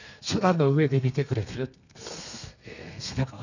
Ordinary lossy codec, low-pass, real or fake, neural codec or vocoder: none; 7.2 kHz; fake; codec, 32 kHz, 1.9 kbps, SNAC